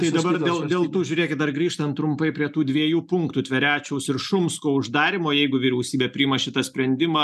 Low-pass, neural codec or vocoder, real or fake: 14.4 kHz; none; real